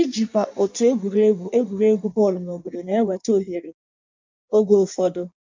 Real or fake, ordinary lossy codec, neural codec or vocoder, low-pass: fake; MP3, 64 kbps; codec, 16 kHz in and 24 kHz out, 1.1 kbps, FireRedTTS-2 codec; 7.2 kHz